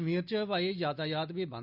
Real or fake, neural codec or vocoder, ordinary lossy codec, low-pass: real; none; none; 5.4 kHz